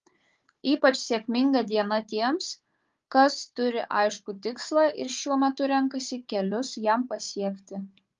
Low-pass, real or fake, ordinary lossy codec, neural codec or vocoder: 7.2 kHz; fake; Opus, 32 kbps; codec, 16 kHz, 4 kbps, FunCodec, trained on Chinese and English, 50 frames a second